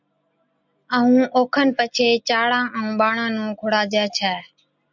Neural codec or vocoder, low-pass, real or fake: none; 7.2 kHz; real